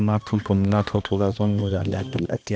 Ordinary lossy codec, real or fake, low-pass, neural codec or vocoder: none; fake; none; codec, 16 kHz, 1 kbps, X-Codec, HuBERT features, trained on balanced general audio